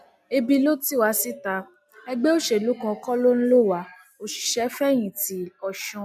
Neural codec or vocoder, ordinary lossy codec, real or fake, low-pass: none; none; real; 14.4 kHz